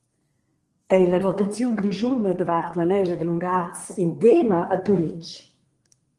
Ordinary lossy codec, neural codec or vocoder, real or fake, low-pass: Opus, 24 kbps; codec, 24 kHz, 1 kbps, SNAC; fake; 10.8 kHz